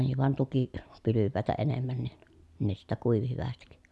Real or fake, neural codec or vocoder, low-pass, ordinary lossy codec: real; none; none; none